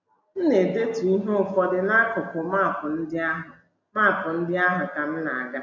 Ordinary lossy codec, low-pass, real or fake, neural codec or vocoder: MP3, 64 kbps; 7.2 kHz; real; none